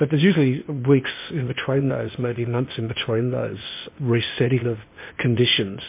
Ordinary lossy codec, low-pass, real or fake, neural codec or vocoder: MP3, 24 kbps; 3.6 kHz; fake; codec, 16 kHz, 0.8 kbps, ZipCodec